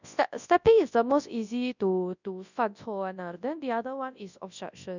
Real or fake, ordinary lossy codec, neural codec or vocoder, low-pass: fake; none; codec, 24 kHz, 0.9 kbps, WavTokenizer, large speech release; 7.2 kHz